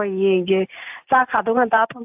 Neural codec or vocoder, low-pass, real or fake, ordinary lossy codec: none; 3.6 kHz; real; AAC, 32 kbps